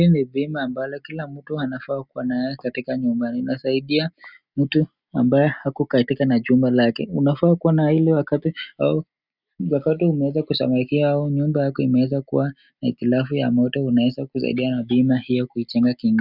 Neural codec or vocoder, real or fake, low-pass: none; real; 5.4 kHz